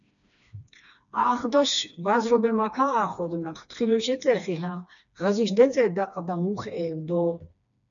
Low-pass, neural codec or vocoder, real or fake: 7.2 kHz; codec, 16 kHz, 2 kbps, FreqCodec, smaller model; fake